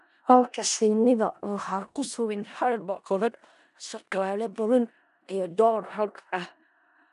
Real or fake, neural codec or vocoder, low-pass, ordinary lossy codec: fake; codec, 16 kHz in and 24 kHz out, 0.4 kbps, LongCat-Audio-Codec, four codebook decoder; 10.8 kHz; none